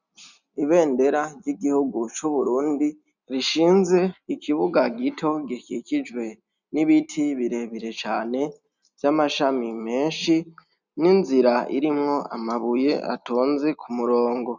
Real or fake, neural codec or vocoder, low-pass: real; none; 7.2 kHz